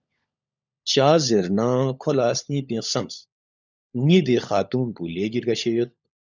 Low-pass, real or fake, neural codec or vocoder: 7.2 kHz; fake; codec, 16 kHz, 16 kbps, FunCodec, trained on LibriTTS, 50 frames a second